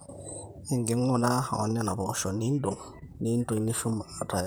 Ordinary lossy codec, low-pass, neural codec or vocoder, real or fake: none; none; vocoder, 44.1 kHz, 128 mel bands, Pupu-Vocoder; fake